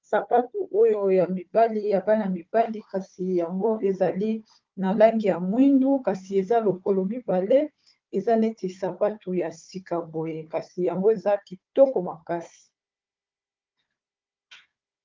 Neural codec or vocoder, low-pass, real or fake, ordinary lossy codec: codec, 16 kHz, 4 kbps, FunCodec, trained on Chinese and English, 50 frames a second; 7.2 kHz; fake; Opus, 32 kbps